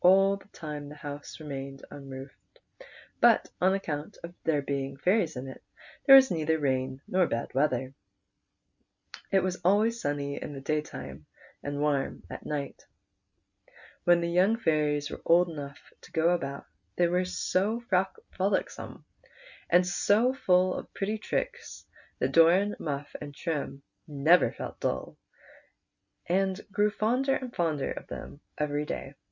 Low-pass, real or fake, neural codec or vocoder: 7.2 kHz; real; none